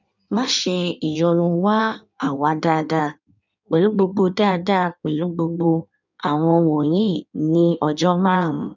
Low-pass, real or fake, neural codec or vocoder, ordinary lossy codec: 7.2 kHz; fake; codec, 16 kHz in and 24 kHz out, 1.1 kbps, FireRedTTS-2 codec; none